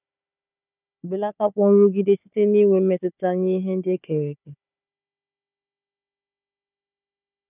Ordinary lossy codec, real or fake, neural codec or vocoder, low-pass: none; fake; codec, 16 kHz, 4 kbps, FunCodec, trained on Chinese and English, 50 frames a second; 3.6 kHz